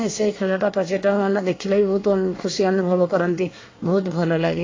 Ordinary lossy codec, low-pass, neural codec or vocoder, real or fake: AAC, 32 kbps; 7.2 kHz; codec, 24 kHz, 1 kbps, SNAC; fake